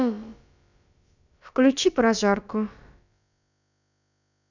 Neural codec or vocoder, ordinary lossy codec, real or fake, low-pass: codec, 16 kHz, about 1 kbps, DyCAST, with the encoder's durations; none; fake; 7.2 kHz